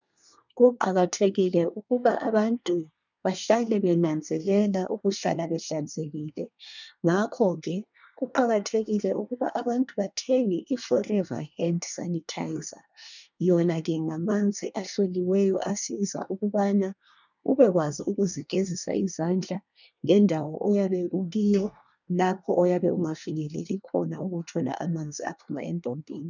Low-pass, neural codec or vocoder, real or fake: 7.2 kHz; codec, 24 kHz, 1 kbps, SNAC; fake